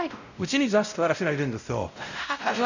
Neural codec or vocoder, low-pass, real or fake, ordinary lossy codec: codec, 16 kHz, 0.5 kbps, X-Codec, WavLM features, trained on Multilingual LibriSpeech; 7.2 kHz; fake; none